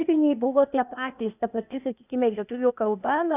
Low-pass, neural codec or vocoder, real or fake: 3.6 kHz; codec, 16 kHz, 0.8 kbps, ZipCodec; fake